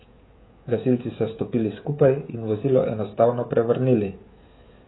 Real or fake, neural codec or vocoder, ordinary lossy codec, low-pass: fake; codec, 16 kHz, 16 kbps, FreqCodec, smaller model; AAC, 16 kbps; 7.2 kHz